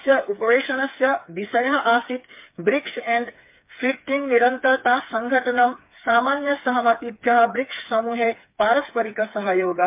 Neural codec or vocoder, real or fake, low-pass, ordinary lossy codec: codec, 16 kHz, 4 kbps, FreqCodec, smaller model; fake; 3.6 kHz; MP3, 32 kbps